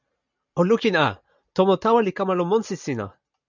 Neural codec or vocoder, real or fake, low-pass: none; real; 7.2 kHz